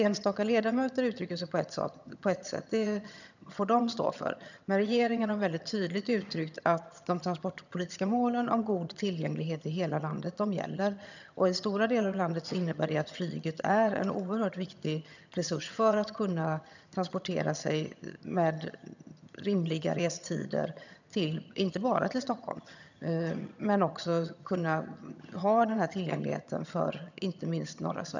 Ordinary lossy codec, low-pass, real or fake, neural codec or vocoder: none; 7.2 kHz; fake; vocoder, 22.05 kHz, 80 mel bands, HiFi-GAN